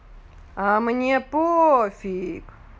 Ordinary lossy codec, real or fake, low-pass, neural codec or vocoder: none; real; none; none